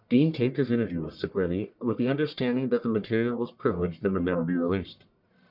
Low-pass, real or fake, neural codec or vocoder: 5.4 kHz; fake; codec, 44.1 kHz, 1.7 kbps, Pupu-Codec